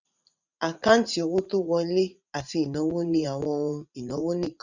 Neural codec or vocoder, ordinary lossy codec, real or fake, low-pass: vocoder, 44.1 kHz, 80 mel bands, Vocos; none; fake; 7.2 kHz